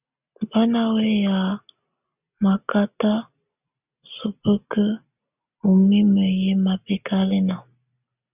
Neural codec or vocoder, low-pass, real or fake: none; 3.6 kHz; real